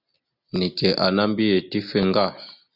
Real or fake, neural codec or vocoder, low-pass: real; none; 5.4 kHz